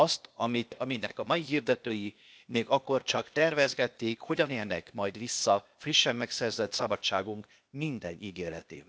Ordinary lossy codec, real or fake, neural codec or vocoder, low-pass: none; fake; codec, 16 kHz, 0.8 kbps, ZipCodec; none